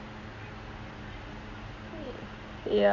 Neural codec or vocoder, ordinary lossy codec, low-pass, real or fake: none; Opus, 64 kbps; 7.2 kHz; real